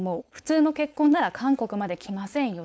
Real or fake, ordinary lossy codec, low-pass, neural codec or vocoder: fake; none; none; codec, 16 kHz, 4.8 kbps, FACodec